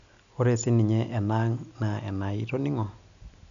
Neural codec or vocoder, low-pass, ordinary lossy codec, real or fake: none; 7.2 kHz; none; real